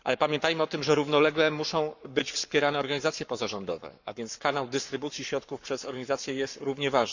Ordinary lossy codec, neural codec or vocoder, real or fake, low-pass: none; codec, 44.1 kHz, 7.8 kbps, Pupu-Codec; fake; 7.2 kHz